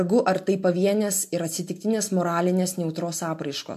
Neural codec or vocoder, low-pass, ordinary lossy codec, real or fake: none; 14.4 kHz; MP3, 64 kbps; real